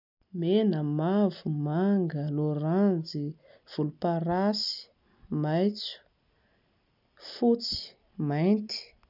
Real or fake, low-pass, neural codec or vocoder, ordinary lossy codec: real; 5.4 kHz; none; none